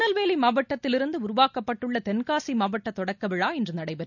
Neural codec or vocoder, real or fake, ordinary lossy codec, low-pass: none; real; none; 7.2 kHz